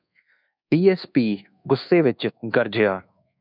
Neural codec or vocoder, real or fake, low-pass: codec, 24 kHz, 1.2 kbps, DualCodec; fake; 5.4 kHz